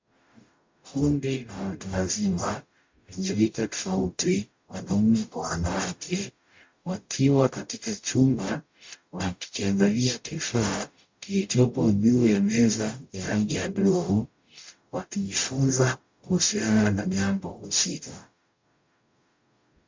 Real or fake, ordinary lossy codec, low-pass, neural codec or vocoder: fake; MP3, 48 kbps; 7.2 kHz; codec, 44.1 kHz, 0.9 kbps, DAC